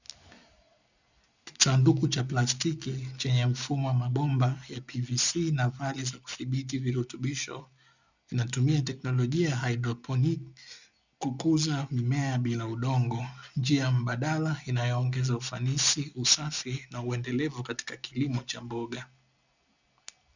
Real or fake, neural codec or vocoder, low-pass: real; none; 7.2 kHz